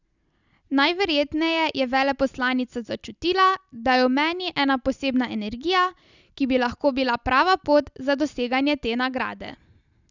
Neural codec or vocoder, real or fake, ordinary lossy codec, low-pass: none; real; none; 7.2 kHz